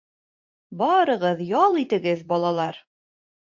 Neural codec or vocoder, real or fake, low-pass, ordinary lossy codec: vocoder, 44.1 kHz, 80 mel bands, Vocos; fake; 7.2 kHz; MP3, 48 kbps